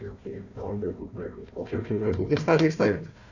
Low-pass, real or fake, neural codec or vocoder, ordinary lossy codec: 7.2 kHz; fake; codec, 16 kHz, 1 kbps, FunCodec, trained on Chinese and English, 50 frames a second; none